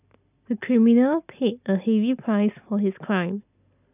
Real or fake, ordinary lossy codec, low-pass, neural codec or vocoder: real; none; 3.6 kHz; none